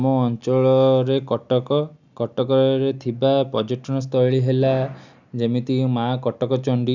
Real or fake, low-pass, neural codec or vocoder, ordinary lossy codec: real; 7.2 kHz; none; none